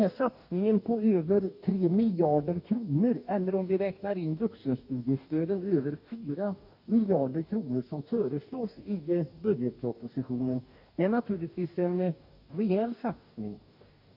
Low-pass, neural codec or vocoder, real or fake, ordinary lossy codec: 5.4 kHz; codec, 44.1 kHz, 2.6 kbps, DAC; fake; none